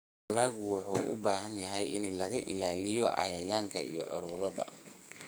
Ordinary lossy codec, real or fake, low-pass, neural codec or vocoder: none; fake; none; codec, 44.1 kHz, 2.6 kbps, SNAC